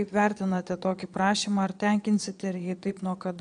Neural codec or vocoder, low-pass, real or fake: vocoder, 22.05 kHz, 80 mel bands, Vocos; 9.9 kHz; fake